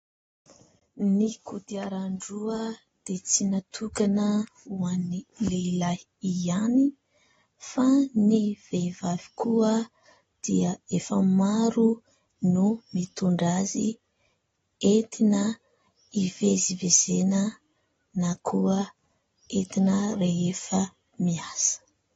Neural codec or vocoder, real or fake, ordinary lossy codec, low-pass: none; real; AAC, 24 kbps; 19.8 kHz